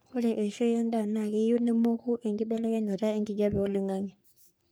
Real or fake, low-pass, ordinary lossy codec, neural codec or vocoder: fake; none; none; codec, 44.1 kHz, 3.4 kbps, Pupu-Codec